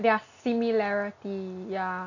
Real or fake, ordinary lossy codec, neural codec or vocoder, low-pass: real; none; none; 7.2 kHz